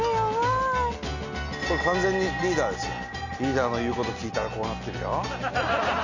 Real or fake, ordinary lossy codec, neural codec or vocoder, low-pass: real; none; none; 7.2 kHz